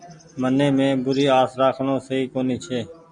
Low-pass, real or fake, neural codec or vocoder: 9.9 kHz; real; none